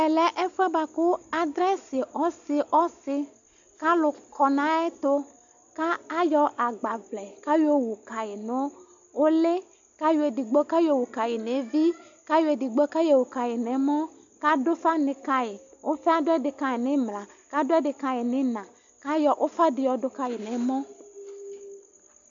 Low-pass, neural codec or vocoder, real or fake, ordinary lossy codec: 7.2 kHz; none; real; AAC, 64 kbps